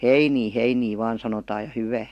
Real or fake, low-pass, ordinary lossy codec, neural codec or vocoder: real; 14.4 kHz; AAC, 64 kbps; none